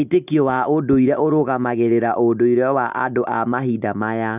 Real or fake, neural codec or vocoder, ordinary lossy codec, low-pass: real; none; none; 3.6 kHz